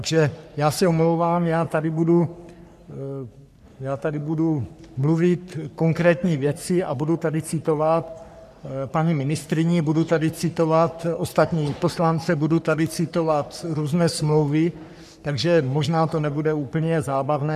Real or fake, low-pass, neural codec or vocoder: fake; 14.4 kHz; codec, 44.1 kHz, 3.4 kbps, Pupu-Codec